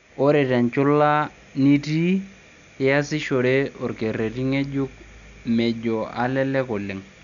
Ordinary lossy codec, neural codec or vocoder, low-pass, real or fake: MP3, 96 kbps; none; 7.2 kHz; real